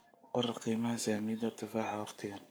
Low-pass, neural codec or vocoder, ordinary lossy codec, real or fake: none; codec, 44.1 kHz, 7.8 kbps, Pupu-Codec; none; fake